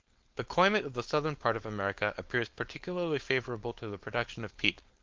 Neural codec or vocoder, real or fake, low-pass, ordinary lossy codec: codec, 16 kHz, 4.8 kbps, FACodec; fake; 7.2 kHz; Opus, 32 kbps